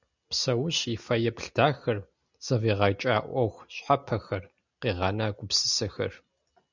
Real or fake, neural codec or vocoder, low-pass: real; none; 7.2 kHz